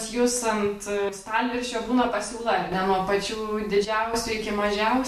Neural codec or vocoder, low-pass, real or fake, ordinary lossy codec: none; 14.4 kHz; real; MP3, 64 kbps